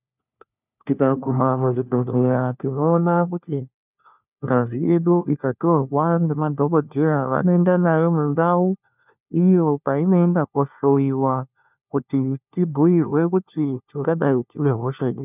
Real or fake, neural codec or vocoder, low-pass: fake; codec, 16 kHz, 1 kbps, FunCodec, trained on LibriTTS, 50 frames a second; 3.6 kHz